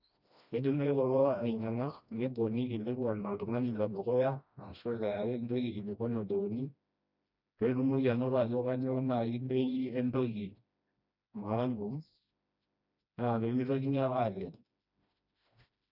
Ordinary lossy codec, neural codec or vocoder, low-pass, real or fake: none; codec, 16 kHz, 1 kbps, FreqCodec, smaller model; 5.4 kHz; fake